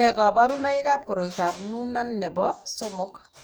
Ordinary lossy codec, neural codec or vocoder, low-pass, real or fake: none; codec, 44.1 kHz, 2.6 kbps, DAC; none; fake